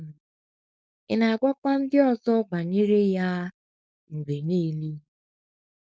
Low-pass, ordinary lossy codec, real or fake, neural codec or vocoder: none; none; fake; codec, 16 kHz, 4.8 kbps, FACodec